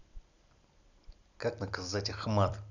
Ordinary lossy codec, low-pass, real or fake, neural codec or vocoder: none; 7.2 kHz; fake; vocoder, 44.1 kHz, 128 mel bands every 256 samples, BigVGAN v2